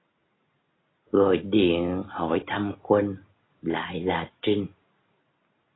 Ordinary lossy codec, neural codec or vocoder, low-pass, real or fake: AAC, 16 kbps; none; 7.2 kHz; real